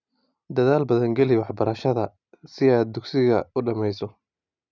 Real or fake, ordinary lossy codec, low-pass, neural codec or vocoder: real; none; 7.2 kHz; none